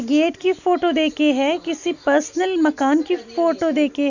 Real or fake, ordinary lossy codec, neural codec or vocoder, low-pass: real; none; none; 7.2 kHz